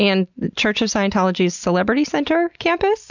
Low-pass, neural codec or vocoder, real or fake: 7.2 kHz; none; real